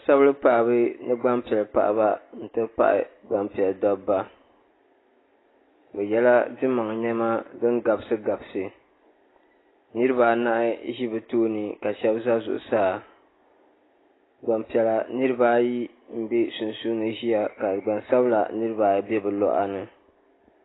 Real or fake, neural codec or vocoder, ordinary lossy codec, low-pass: real; none; AAC, 16 kbps; 7.2 kHz